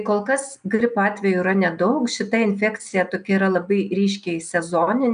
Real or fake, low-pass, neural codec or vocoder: real; 9.9 kHz; none